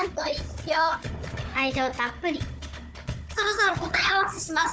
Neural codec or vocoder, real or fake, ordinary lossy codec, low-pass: codec, 16 kHz, 4 kbps, FunCodec, trained on Chinese and English, 50 frames a second; fake; none; none